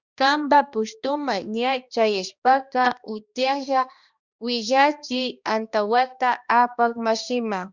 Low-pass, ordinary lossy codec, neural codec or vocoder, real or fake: 7.2 kHz; Opus, 64 kbps; codec, 16 kHz, 1 kbps, X-Codec, HuBERT features, trained on balanced general audio; fake